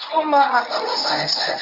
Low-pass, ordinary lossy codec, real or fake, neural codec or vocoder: 5.4 kHz; MP3, 48 kbps; fake; codec, 24 kHz, 0.9 kbps, WavTokenizer, medium speech release version 1